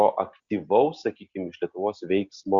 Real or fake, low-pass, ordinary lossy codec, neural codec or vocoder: real; 7.2 kHz; Opus, 64 kbps; none